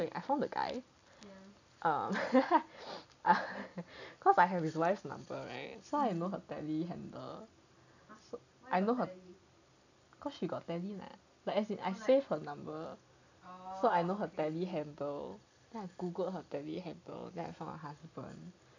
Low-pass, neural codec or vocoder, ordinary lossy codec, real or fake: 7.2 kHz; none; none; real